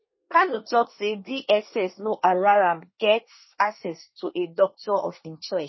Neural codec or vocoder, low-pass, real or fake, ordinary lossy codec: codec, 24 kHz, 1 kbps, SNAC; 7.2 kHz; fake; MP3, 24 kbps